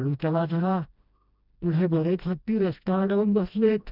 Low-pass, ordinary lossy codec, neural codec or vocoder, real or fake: 5.4 kHz; none; codec, 16 kHz, 1 kbps, FreqCodec, smaller model; fake